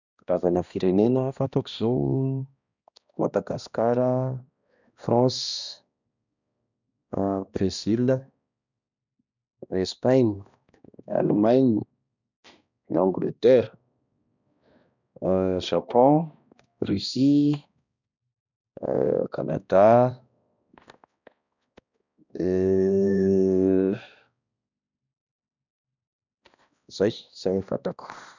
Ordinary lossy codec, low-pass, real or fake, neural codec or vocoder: none; 7.2 kHz; fake; codec, 16 kHz, 1 kbps, X-Codec, HuBERT features, trained on balanced general audio